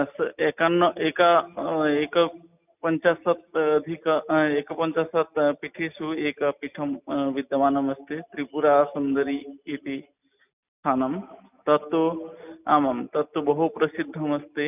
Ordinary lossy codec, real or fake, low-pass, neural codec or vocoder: none; real; 3.6 kHz; none